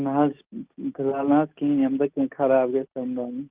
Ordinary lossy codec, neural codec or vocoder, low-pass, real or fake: Opus, 32 kbps; none; 3.6 kHz; real